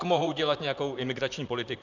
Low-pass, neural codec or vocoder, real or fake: 7.2 kHz; vocoder, 44.1 kHz, 128 mel bands, Pupu-Vocoder; fake